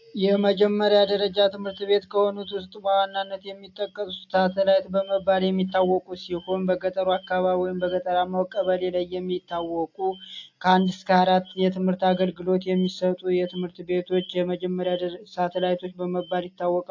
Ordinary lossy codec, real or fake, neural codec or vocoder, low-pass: AAC, 48 kbps; real; none; 7.2 kHz